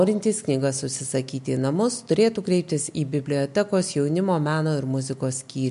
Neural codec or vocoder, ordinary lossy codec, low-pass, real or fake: none; MP3, 64 kbps; 10.8 kHz; real